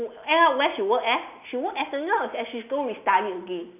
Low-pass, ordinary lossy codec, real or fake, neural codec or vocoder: 3.6 kHz; none; real; none